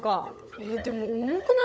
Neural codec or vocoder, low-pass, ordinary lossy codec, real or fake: codec, 16 kHz, 16 kbps, FunCodec, trained on LibriTTS, 50 frames a second; none; none; fake